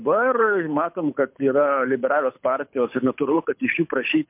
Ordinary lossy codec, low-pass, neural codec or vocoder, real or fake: MP3, 32 kbps; 3.6 kHz; codec, 24 kHz, 6 kbps, HILCodec; fake